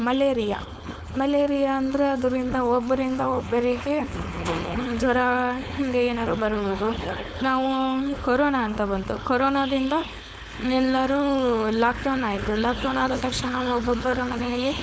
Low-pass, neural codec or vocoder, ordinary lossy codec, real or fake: none; codec, 16 kHz, 4.8 kbps, FACodec; none; fake